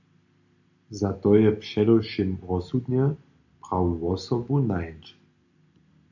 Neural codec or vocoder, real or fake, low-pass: none; real; 7.2 kHz